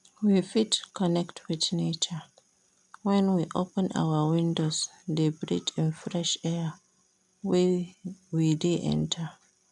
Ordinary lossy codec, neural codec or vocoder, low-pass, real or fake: none; none; 10.8 kHz; real